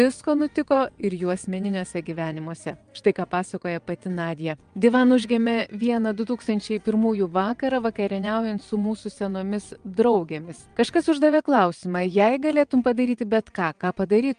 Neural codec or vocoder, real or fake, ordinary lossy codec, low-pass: vocoder, 22.05 kHz, 80 mel bands, WaveNeXt; fake; Opus, 32 kbps; 9.9 kHz